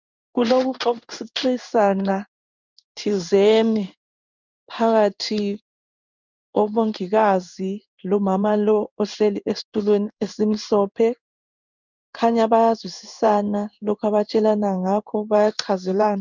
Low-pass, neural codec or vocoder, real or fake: 7.2 kHz; codec, 16 kHz in and 24 kHz out, 1 kbps, XY-Tokenizer; fake